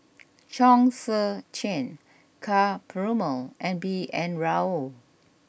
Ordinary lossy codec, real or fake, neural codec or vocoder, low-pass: none; real; none; none